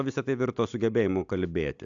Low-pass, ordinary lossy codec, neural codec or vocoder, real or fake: 7.2 kHz; AAC, 64 kbps; none; real